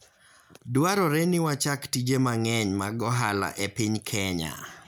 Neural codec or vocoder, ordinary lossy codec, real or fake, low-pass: none; none; real; none